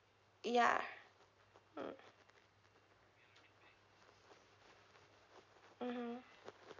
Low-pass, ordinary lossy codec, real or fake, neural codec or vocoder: 7.2 kHz; none; real; none